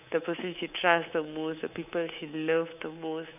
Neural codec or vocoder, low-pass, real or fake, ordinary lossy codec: codec, 24 kHz, 3.1 kbps, DualCodec; 3.6 kHz; fake; none